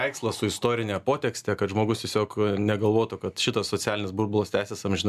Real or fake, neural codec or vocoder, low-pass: real; none; 14.4 kHz